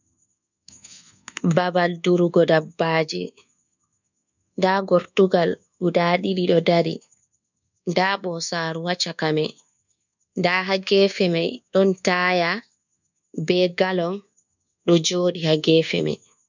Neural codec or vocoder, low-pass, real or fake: codec, 24 kHz, 1.2 kbps, DualCodec; 7.2 kHz; fake